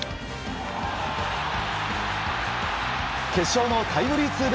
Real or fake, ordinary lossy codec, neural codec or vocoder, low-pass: real; none; none; none